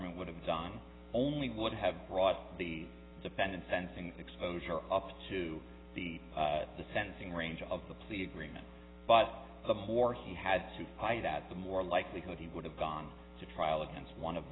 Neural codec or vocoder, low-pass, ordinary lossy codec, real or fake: none; 7.2 kHz; AAC, 16 kbps; real